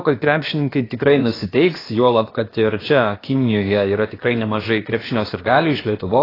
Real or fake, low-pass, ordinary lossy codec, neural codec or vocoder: fake; 5.4 kHz; AAC, 24 kbps; codec, 16 kHz, about 1 kbps, DyCAST, with the encoder's durations